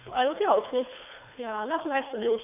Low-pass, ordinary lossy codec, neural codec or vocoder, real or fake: 3.6 kHz; none; codec, 24 kHz, 3 kbps, HILCodec; fake